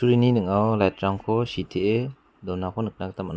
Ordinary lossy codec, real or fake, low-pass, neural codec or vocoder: none; real; none; none